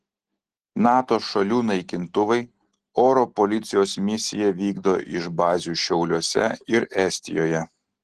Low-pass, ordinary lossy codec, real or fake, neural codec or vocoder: 10.8 kHz; Opus, 16 kbps; real; none